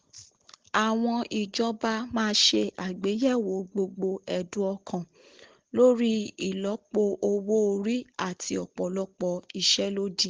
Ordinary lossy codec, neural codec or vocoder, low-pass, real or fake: Opus, 16 kbps; none; 7.2 kHz; real